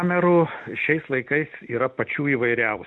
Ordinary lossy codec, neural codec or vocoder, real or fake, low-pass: MP3, 64 kbps; none; real; 10.8 kHz